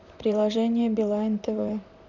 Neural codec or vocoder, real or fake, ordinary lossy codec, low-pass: vocoder, 44.1 kHz, 128 mel bands, Pupu-Vocoder; fake; none; 7.2 kHz